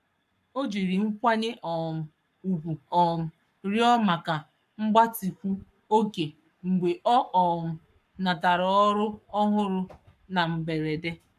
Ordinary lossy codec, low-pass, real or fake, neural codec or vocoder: none; 14.4 kHz; fake; codec, 44.1 kHz, 7.8 kbps, Pupu-Codec